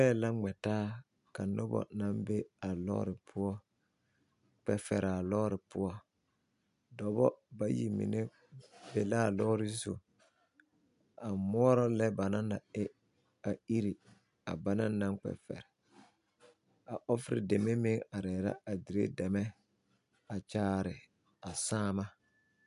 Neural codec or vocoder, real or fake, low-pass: none; real; 10.8 kHz